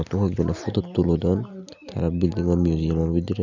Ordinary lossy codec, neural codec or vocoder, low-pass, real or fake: none; none; 7.2 kHz; real